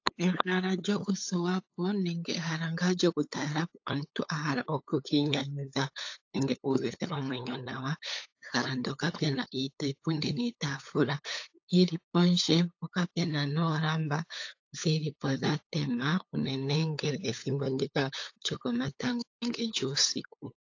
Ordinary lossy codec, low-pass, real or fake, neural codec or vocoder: AAC, 48 kbps; 7.2 kHz; fake; codec, 16 kHz, 8 kbps, FunCodec, trained on LibriTTS, 25 frames a second